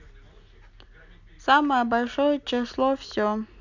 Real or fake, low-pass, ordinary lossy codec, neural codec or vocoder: real; 7.2 kHz; none; none